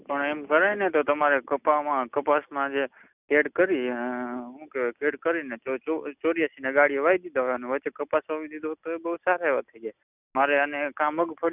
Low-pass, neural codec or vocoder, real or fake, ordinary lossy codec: 3.6 kHz; none; real; none